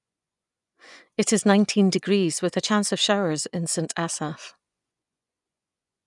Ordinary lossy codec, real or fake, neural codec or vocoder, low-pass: none; fake; vocoder, 24 kHz, 100 mel bands, Vocos; 10.8 kHz